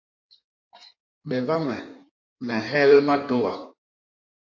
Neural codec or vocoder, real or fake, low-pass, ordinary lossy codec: codec, 16 kHz in and 24 kHz out, 1.1 kbps, FireRedTTS-2 codec; fake; 7.2 kHz; AAC, 48 kbps